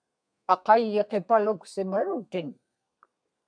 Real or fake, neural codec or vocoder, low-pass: fake; codec, 32 kHz, 1.9 kbps, SNAC; 9.9 kHz